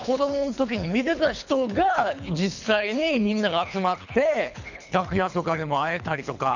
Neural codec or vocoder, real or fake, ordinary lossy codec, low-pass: codec, 24 kHz, 3 kbps, HILCodec; fake; none; 7.2 kHz